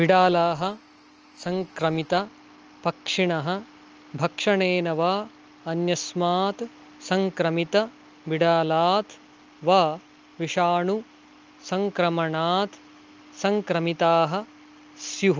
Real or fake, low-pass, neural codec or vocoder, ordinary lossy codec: real; 7.2 kHz; none; Opus, 24 kbps